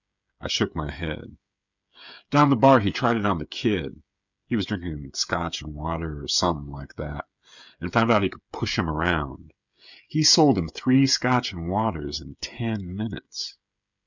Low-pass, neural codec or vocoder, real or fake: 7.2 kHz; codec, 16 kHz, 16 kbps, FreqCodec, smaller model; fake